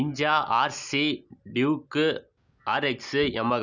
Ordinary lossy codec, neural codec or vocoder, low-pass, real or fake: none; none; 7.2 kHz; real